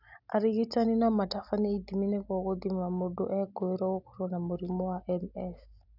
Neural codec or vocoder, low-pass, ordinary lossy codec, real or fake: none; 5.4 kHz; none; real